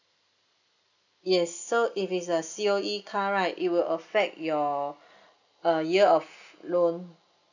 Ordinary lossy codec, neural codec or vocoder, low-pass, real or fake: none; none; 7.2 kHz; real